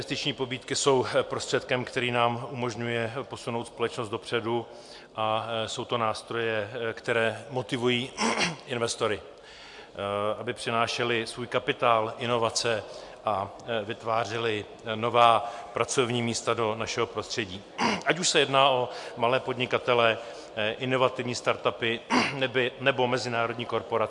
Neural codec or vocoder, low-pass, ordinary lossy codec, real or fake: none; 10.8 kHz; MP3, 64 kbps; real